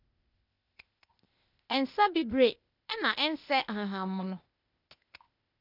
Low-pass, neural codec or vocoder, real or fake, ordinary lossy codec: 5.4 kHz; codec, 16 kHz, 0.8 kbps, ZipCodec; fake; none